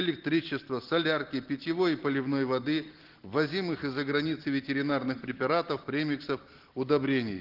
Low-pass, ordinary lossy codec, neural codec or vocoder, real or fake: 5.4 kHz; Opus, 16 kbps; none; real